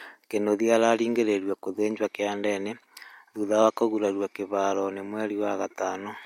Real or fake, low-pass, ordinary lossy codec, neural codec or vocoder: real; 19.8 kHz; MP3, 64 kbps; none